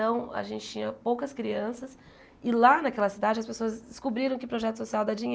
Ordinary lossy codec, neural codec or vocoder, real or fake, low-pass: none; none; real; none